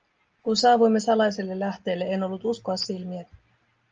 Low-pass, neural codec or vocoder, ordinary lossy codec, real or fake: 7.2 kHz; none; Opus, 24 kbps; real